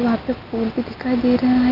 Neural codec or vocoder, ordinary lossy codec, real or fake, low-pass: none; Opus, 24 kbps; real; 5.4 kHz